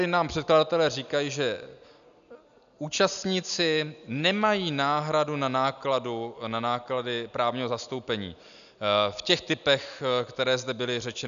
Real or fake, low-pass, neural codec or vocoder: real; 7.2 kHz; none